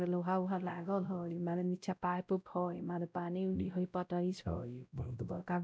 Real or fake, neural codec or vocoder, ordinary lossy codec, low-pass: fake; codec, 16 kHz, 0.5 kbps, X-Codec, WavLM features, trained on Multilingual LibriSpeech; none; none